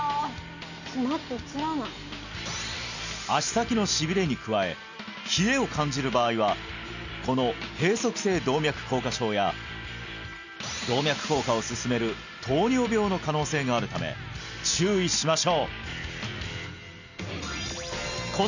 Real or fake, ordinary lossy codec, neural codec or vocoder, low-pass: real; none; none; 7.2 kHz